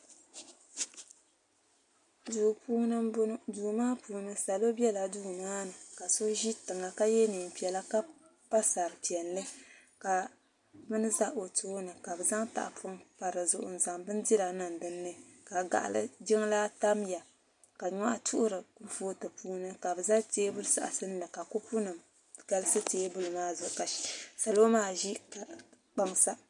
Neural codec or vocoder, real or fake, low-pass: none; real; 10.8 kHz